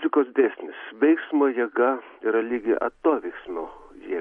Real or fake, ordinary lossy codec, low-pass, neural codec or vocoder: real; MP3, 48 kbps; 19.8 kHz; none